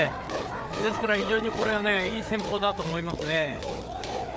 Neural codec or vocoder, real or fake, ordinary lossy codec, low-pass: codec, 16 kHz, 4 kbps, FreqCodec, larger model; fake; none; none